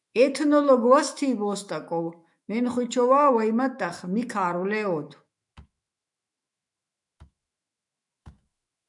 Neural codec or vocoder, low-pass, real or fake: autoencoder, 48 kHz, 128 numbers a frame, DAC-VAE, trained on Japanese speech; 10.8 kHz; fake